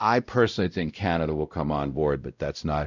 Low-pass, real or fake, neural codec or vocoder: 7.2 kHz; fake; codec, 16 kHz, 0.5 kbps, X-Codec, WavLM features, trained on Multilingual LibriSpeech